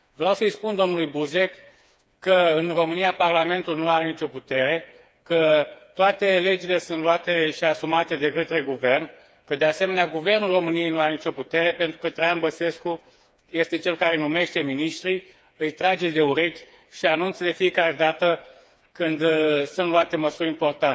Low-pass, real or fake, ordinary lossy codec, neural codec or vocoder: none; fake; none; codec, 16 kHz, 4 kbps, FreqCodec, smaller model